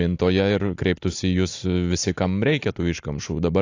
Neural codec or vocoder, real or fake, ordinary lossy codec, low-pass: none; real; AAC, 48 kbps; 7.2 kHz